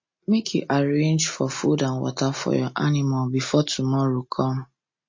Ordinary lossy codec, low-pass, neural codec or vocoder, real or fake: MP3, 32 kbps; 7.2 kHz; none; real